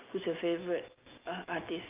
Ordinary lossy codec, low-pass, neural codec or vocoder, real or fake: Opus, 24 kbps; 3.6 kHz; none; real